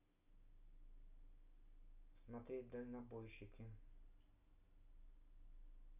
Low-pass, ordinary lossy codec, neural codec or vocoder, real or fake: 3.6 kHz; none; none; real